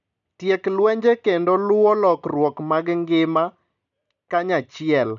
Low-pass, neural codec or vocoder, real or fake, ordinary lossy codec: 7.2 kHz; none; real; none